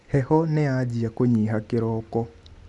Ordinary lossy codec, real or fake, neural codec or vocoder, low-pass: none; real; none; 10.8 kHz